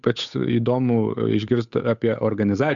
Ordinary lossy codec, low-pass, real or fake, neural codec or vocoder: MP3, 96 kbps; 7.2 kHz; fake; codec, 16 kHz, 8 kbps, FunCodec, trained on Chinese and English, 25 frames a second